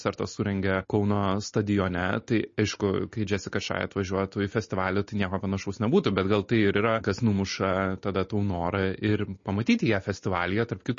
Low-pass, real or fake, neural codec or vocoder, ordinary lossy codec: 7.2 kHz; real; none; MP3, 32 kbps